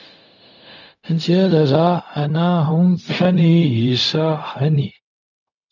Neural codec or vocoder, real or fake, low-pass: codec, 16 kHz, 0.4 kbps, LongCat-Audio-Codec; fake; 7.2 kHz